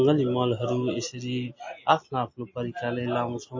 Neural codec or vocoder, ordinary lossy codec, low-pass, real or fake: none; MP3, 32 kbps; 7.2 kHz; real